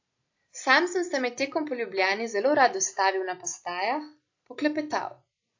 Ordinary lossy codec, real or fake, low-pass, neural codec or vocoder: AAC, 48 kbps; real; 7.2 kHz; none